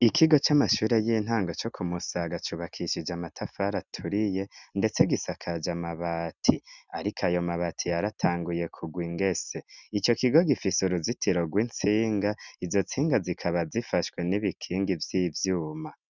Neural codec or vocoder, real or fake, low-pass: none; real; 7.2 kHz